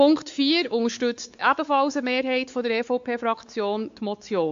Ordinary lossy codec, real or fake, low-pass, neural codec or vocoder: AAC, 64 kbps; fake; 7.2 kHz; codec, 16 kHz, 4 kbps, X-Codec, WavLM features, trained on Multilingual LibriSpeech